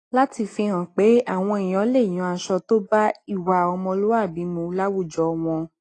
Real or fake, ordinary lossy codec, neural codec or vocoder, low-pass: real; AAC, 32 kbps; none; 10.8 kHz